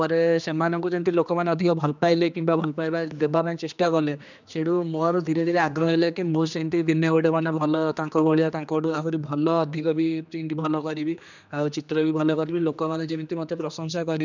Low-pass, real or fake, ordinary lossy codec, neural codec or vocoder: 7.2 kHz; fake; none; codec, 16 kHz, 2 kbps, X-Codec, HuBERT features, trained on general audio